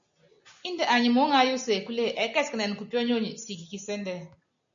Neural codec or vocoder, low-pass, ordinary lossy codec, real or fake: none; 7.2 kHz; MP3, 64 kbps; real